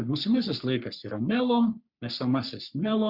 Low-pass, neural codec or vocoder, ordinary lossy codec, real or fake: 5.4 kHz; codec, 44.1 kHz, 3.4 kbps, Pupu-Codec; Opus, 64 kbps; fake